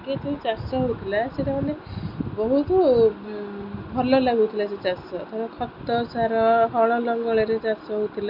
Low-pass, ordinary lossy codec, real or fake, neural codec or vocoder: 5.4 kHz; none; real; none